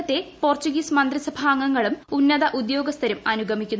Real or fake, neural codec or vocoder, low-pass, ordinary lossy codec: real; none; none; none